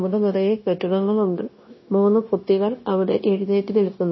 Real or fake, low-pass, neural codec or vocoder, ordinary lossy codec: fake; 7.2 kHz; codec, 16 kHz, 0.9 kbps, LongCat-Audio-Codec; MP3, 24 kbps